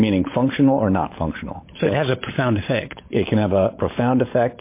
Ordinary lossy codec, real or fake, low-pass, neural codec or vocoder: MP3, 24 kbps; fake; 3.6 kHz; codec, 16 kHz, 16 kbps, FunCodec, trained on LibriTTS, 50 frames a second